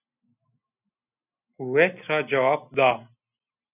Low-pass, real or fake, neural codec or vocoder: 3.6 kHz; real; none